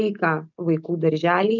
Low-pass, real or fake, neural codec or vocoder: 7.2 kHz; real; none